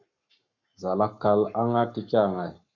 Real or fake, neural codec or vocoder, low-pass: fake; codec, 44.1 kHz, 7.8 kbps, Pupu-Codec; 7.2 kHz